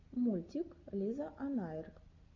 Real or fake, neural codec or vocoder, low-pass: real; none; 7.2 kHz